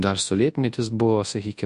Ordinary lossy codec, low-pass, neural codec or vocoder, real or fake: MP3, 48 kbps; 10.8 kHz; codec, 24 kHz, 0.9 kbps, WavTokenizer, large speech release; fake